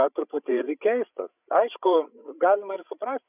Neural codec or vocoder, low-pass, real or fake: codec, 16 kHz, 8 kbps, FreqCodec, larger model; 3.6 kHz; fake